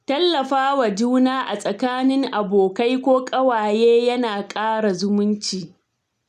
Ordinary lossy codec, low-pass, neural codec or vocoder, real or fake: none; 14.4 kHz; none; real